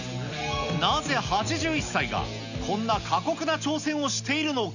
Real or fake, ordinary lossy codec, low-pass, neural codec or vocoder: real; none; 7.2 kHz; none